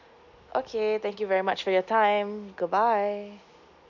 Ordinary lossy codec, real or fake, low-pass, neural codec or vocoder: none; real; 7.2 kHz; none